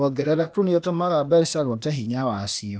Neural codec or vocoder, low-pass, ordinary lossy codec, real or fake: codec, 16 kHz, 0.8 kbps, ZipCodec; none; none; fake